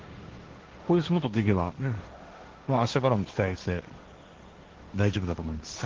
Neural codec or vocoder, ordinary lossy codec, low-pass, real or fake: codec, 16 kHz, 1.1 kbps, Voila-Tokenizer; Opus, 16 kbps; 7.2 kHz; fake